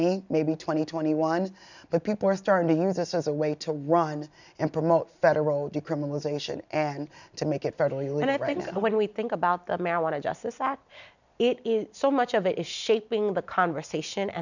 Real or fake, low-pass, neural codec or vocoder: real; 7.2 kHz; none